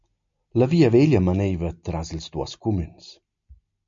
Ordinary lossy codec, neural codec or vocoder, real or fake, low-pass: MP3, 48 kbps; none; real; 7.2 kHz